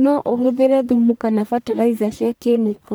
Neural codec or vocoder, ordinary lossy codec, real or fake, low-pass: codec, 44.1 kHz, 1.7 kbps, Pupu-Codec; none; fake; none